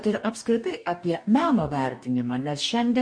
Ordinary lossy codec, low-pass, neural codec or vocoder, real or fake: MP3, 48 kbps; 9.9 kHz; codec, 44.1 kHz, 2.6 kbps, DAC; fake